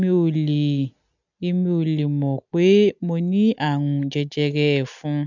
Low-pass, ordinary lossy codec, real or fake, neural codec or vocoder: 7.2 kHz; none; real; none